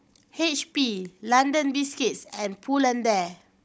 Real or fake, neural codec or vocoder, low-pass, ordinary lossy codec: fake; codec, 16 kHz, 16 kbps, FunCodec, trained on Chinese and English, 50 frames a second; none; none